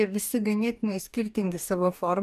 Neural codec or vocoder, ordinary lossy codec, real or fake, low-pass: codec, 44.1 kHz, 2.6 kbps, DAC; AAC, 96 kbps; fake; 14.4 kHz